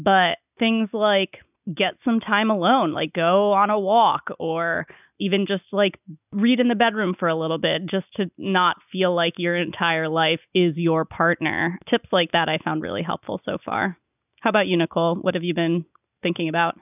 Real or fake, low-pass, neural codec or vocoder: real; 3.6 kHz; none